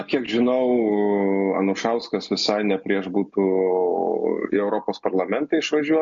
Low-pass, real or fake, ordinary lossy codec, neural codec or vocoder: 7.2 kHz; real; MP3, 48 kbps; none